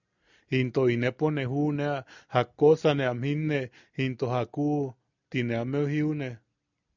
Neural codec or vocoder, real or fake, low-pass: none; real; 7.2 kHz